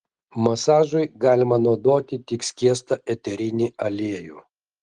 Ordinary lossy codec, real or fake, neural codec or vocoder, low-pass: Opus, 24 kbps; real; none; 10.8 kHz